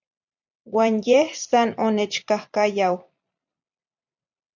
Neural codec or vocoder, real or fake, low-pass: vocoder, 44.1 kHz, 128 mel bands every 256 samples, BigVGAN v2; fake; 7.2 kHz